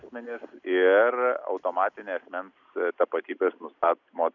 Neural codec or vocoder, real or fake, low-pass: none; real; 7.2 kHz